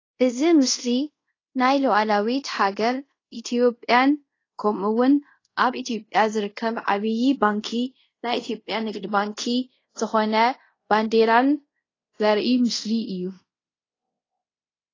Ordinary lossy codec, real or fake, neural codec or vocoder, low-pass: AAC, 32 kbps; fake; codec, 24 kHz, 0.5 kbps, DualCodec; 7.2 kHz